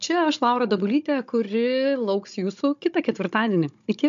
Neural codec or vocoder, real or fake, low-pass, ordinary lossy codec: codec, 16 kHz, 16 kbps, FunCodec, trained on Chinese and English, 50 frames a second; fake; 7.2 kHz; MP3, 64 kbps